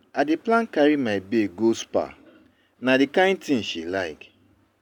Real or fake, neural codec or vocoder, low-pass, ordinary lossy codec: real; none; 19.8 kHz; none